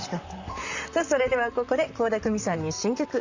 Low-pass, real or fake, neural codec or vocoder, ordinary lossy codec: 7.2 kHz; fake; vocoder, 44.1 kHz, 128 mel bands, Pupu-Vocoder; Opus, 64 kbps